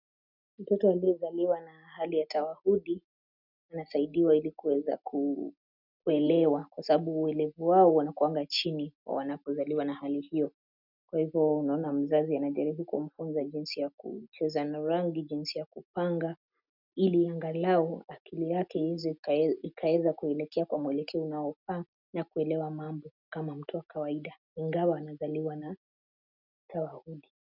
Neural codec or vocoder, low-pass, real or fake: none; 5.4 kHz; real